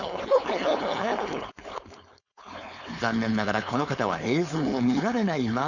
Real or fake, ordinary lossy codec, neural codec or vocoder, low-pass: fake; none; codec, 16 kHz, 4.8 kbps, FACodec; 7.2 kHz